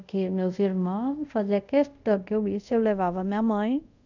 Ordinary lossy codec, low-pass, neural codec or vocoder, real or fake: none; 7.2 kHz; codec, 24 kHz, 0.5 kbps, DualCodec; fake